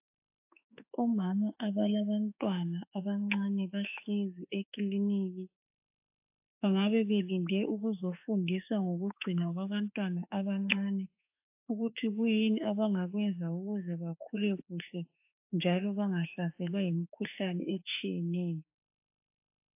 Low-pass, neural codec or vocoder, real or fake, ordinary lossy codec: 3.6 kHz; autoencoder, 48 kHz, 32 numbers a frame, DAC-VAE, trained on Japanese speech; fake; AAC, 32 kbps